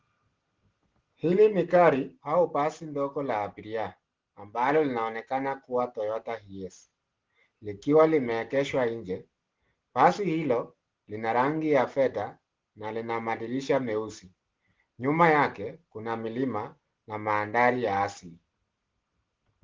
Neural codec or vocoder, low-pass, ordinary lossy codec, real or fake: none; 7.2 kHz; Opus, 16 kbps; real